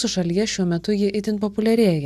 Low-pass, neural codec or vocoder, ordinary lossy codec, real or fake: 14.4 kHz; none; AAC, 96 kbps; real